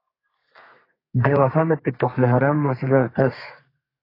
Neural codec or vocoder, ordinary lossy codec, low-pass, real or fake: codec, 32 kHz, 1.9 kbps, SNAC; AAC, 24 kbps; 5.4 kHz; fake